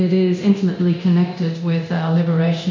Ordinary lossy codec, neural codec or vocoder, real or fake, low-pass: MP3, 48 kbps; codec, 24 kHz, 0.9 kbps, DualCodec; fake; 7.2 kHz